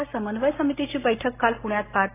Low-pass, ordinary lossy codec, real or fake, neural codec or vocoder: 3.6 kHz; AAC, 16 kbps; real; none